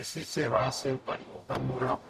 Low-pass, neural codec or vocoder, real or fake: 14.4 kHz; codec, 44.1 kHz, 0.9 kbps, DAC; fake